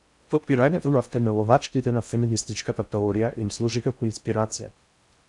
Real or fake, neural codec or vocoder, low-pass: fake; codec, 16 kHz in and 24 kHz out, 0.6 kbps, FocalCodec, streaming, 4096 codes; 10.8 kHz